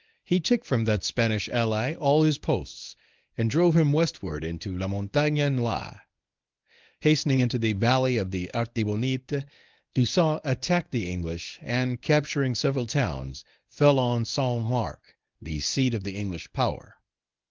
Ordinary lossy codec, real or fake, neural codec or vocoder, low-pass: Opus, 24 kbps; fake; codec, 24 kHz, 0.9 kbps, WavTokenizer, medium speech release version 1; 7.2 kHz